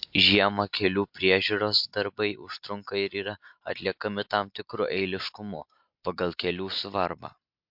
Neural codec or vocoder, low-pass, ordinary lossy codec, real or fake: none; 5.4 kHz; MP3, 48 kbps; real